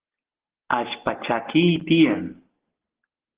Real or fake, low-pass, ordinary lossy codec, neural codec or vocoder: real; 3.6 kHz; Opus, 16 kbps; none